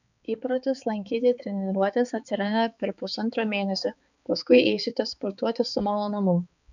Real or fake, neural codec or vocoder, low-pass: fake; codec, 16 kHz, 4 kbps, X-Codec, HuBERT features, trained on balanced general audio; 7.2 kHz